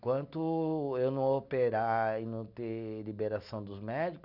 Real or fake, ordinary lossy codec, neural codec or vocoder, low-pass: real; none; none; 5.4 kHz